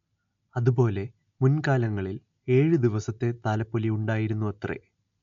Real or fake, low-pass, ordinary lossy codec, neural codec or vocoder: real; 7.2 kHz; MP3, 64 kbps; none